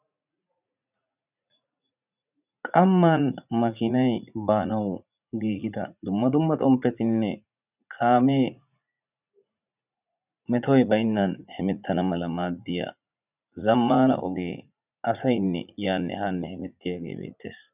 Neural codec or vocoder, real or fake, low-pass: vocoder, 44.1 kHz, 80 mel bands, Vocos; fake; 3.6 kHz